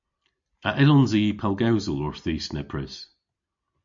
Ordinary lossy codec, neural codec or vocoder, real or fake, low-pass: AAC, 64 kbps; none; real; 7.2 kHz